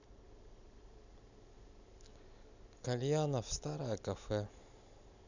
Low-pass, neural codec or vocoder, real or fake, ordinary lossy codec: 7.2 kHz; none; real; none